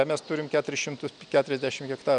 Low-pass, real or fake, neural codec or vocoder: 9.9 kHz; real; none